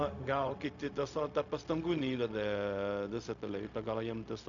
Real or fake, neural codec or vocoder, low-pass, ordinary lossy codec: fake; codec, 16 kHz, 0.4 kbps, LongCat-Audio-Codec; 7.2 kHz; AAC, 64 kbps